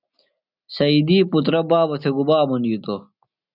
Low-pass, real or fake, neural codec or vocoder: 5.4 kHz; real; none